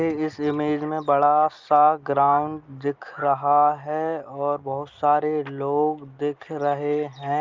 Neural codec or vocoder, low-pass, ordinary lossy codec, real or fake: none; 7.2 kHz; Opus, 32 kbps; real